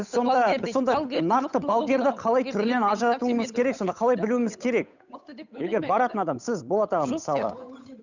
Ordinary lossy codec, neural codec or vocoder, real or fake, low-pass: none; codec, 16 kHz, 8 kbps, FunCodec, trained on Chinese and English, 25 frames a second; fake; 7.2 kHz